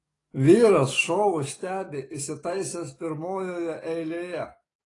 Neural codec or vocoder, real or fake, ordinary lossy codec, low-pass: codec, 44.1 kHz, 7.8 kbps, DAC; fake; AAC, 32 kbps; 10.8 kHz